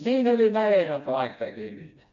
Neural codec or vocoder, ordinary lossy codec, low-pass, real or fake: codec, 16 kHz, 1 kbps, FreqCodec, smaller model; none; 7.2 kHz; fake